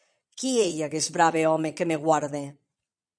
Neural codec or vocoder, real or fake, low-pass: vocoder, 22.05 kHz, 80 mel bands, Vocos; fake; 9.9 kHz